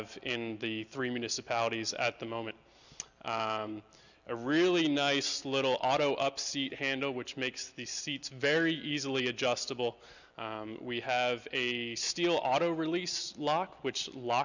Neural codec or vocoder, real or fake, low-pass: none; real; 7.2 kHz